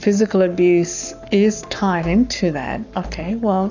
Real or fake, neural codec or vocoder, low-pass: fake; codec, 44.1 kHz, 7.8 kbps, Pupu-Codec; 7.2 kHz